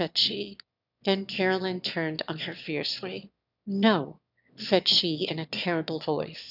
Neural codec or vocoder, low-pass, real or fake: autoencoder, 22.05 kHz, a latent of 192 numbers a frame, VITS, trained on one speaker; 5.4 kHz; fake